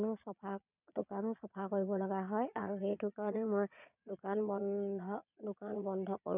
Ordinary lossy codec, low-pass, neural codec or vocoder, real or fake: none; 3.6 kHz; vocoder, 22.05 kHz, 80 mel bands, Vocos; fake